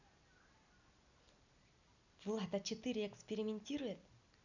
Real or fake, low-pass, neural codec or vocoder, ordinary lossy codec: real; 7.2 kHz; none; none